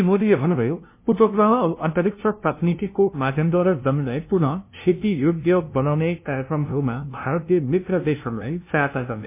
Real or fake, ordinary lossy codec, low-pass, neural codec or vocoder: fake; MP3, 24 kbps; 3.6 kHz; codec, 16 kHz, 0.5 kbps, FunCodec, trained on LibriTTS, 25 frames a second